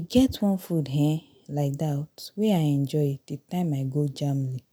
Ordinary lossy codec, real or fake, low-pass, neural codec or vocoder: none; real; none; none